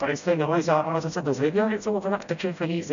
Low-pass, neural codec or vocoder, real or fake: 7.2 kHz; codec, 16 kHz, 0.5 kbps, FreqCodec, smaller model; fake